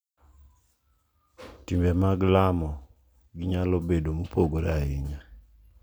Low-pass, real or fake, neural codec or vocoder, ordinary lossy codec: none; real; none; none